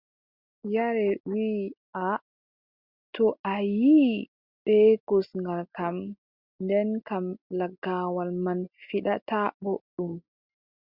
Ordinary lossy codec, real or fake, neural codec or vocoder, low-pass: Opus, 64 kbps; real; none; 5.4 kHz